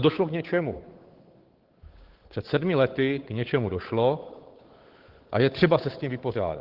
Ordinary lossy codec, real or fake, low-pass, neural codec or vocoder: Opus, 16 kbps; fake; 5.4 kHz; codec, 16 kHz, 8 kbps, FunCodec, trained on Chinese and English, 25 frames a second